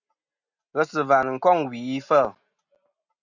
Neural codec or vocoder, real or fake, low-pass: none; real; 7.2 kHz